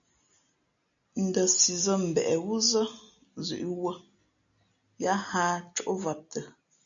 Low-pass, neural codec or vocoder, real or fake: 7.2 kHz; none; real